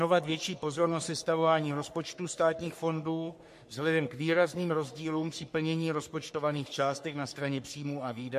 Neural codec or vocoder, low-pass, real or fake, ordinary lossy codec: codec, 44.1 kHz, 3.4 kbps, Pupu-Codec; 14.4 kHz; fake; MP3, 64 kbps